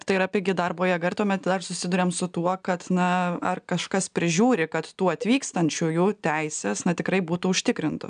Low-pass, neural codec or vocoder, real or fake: 9.9 kHz; none; real